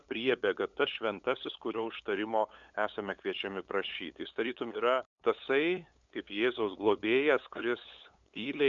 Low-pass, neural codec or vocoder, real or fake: 7.2 kHz; codec, 16 kHz, 8 kbps, FunCodec, trained on LibriTTS, 25 frames a second; fake